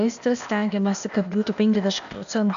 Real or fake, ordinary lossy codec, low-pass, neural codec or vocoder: fake; AAC, 96 kbps; 7.2 kHz; codec, 16 kHz, 0.8 kbps, ZipCodec